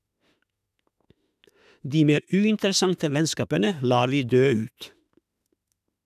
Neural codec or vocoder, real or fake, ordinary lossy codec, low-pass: autoencoder, 48 kHz, 32 numbers a frame, DAC-VAE, trained on Japanese speech; fake; none; 14.4 kHz